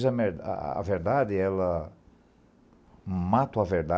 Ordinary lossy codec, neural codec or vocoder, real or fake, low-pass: none; none; real; none